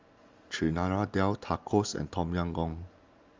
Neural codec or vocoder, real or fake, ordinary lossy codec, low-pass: none; real; Opus, 32 kbps; 7.2 kHz